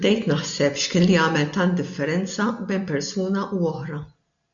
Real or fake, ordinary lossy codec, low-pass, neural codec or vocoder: real; MP3, 64 kbps; 7.2 kHz; none